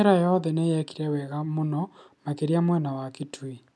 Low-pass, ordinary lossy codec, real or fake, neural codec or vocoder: none; none; real; none